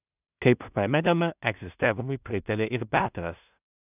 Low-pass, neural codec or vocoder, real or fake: 3.6 kHz; codec, 16 kHz in and 24 kHz out, 0.4 kbps, LongCat-Audio-Codec, two codebook decoder; fake